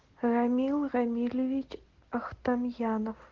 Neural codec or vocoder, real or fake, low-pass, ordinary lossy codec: none; real; 7.2 kHz; Opus, 16 kbps